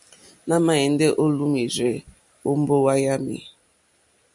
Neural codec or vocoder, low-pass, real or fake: none; 10.8 kHz; real